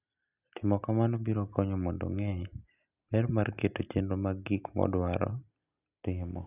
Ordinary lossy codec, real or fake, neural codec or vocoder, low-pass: none; real; none; 3.6 kHz